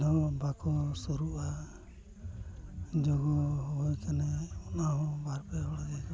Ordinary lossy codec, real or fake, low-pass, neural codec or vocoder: none; real; none; none